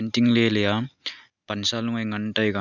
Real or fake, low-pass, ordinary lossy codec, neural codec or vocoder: real; 7.2 kHz; none; none